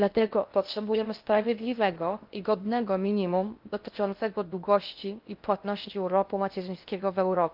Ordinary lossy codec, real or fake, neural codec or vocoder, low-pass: Opus, 24 kbps; fake; codec, 16 kHz in and 24 kHz out, 0.6 kbps, FocalCodec, streaming, 2048 codes; 5.4 kHz